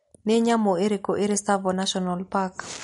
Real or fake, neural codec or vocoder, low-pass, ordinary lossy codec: real; none; 19.8 kHz; MP3, 48 kbps